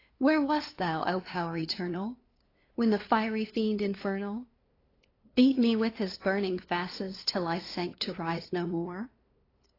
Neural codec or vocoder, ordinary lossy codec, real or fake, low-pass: codec, 16 kHz, 2 kbps, FunCodec, trained on LibriTTS, 25 frames a second; AAC, 24 kbps; fake; 5.4 kHz